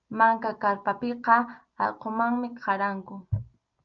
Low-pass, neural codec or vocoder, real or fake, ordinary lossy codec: 7.2 kHz; none; real; Opus, 24 kbps